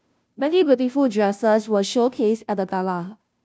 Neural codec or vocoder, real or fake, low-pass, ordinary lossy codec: codec, 16 kHz, 0.5 kbps, FunCodec, trained on Chinese and English, 25 frames a second; fake; none; none